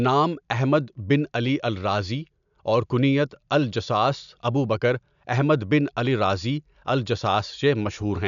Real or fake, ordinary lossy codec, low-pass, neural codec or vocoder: real; none; 7.2 kHz; none